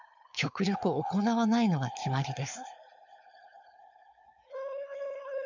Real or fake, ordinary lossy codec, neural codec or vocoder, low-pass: fake; none; codec, 16 kHz, 4.8 kbps, FACodec; 7.2 kHz